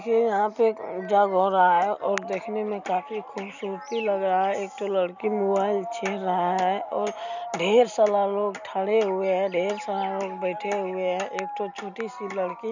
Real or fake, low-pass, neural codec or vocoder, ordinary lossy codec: real; 7.2 kHz; none; none